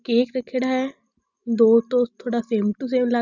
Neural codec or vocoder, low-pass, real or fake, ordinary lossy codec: none; 7.2 kHz; real; none